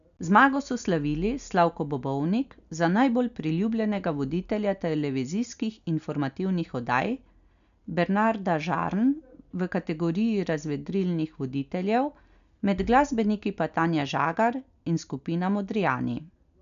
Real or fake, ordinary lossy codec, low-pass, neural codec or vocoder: real; none; 7.2 kHz; none